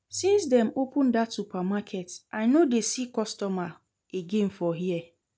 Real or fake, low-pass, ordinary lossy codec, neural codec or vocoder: real; none; none; none